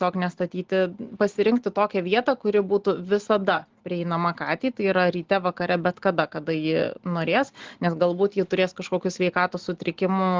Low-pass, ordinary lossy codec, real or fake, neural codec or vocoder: 7.2 kHz; Opus, 16 kbps; real; none